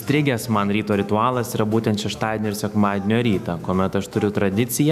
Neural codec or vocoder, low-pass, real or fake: autoencoder, 48 kHz, 128 numbers a frame, DAC-VAE, trained on Japanese speech; 14.4 kHz; fake